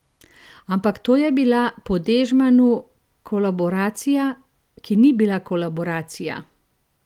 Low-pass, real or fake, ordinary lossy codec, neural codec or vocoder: 19.8 kHz; real; Opus, 24 kbps; none